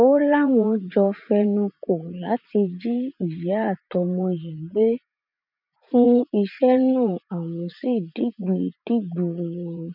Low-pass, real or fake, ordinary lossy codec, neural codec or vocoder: 5.4 kHz; fake; none; vocoder, 44.1 kHz, 80 mel bands, Vocos